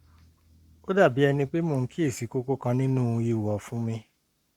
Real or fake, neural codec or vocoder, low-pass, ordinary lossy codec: fake; codec, 44.1 kHz, 7.8 kbps, Pupu-Codec; 19.8 kHz; Opus, 64 kbps